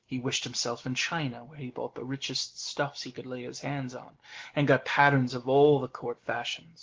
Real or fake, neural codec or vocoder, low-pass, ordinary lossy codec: real; none; 7.2 kHz; Opus, 16 kbps